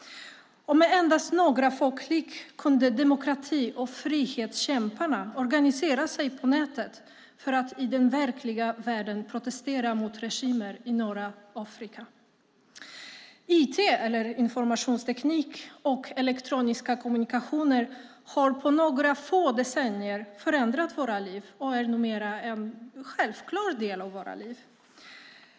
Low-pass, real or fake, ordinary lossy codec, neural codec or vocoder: none; real; none; none